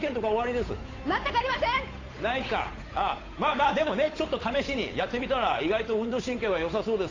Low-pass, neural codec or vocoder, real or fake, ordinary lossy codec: 7.2 kHz; codec, 16 kHz, 8 kbps, FunCodec, trained on Chinese and English, 25 frames a second; fake; AAC, 32 kbps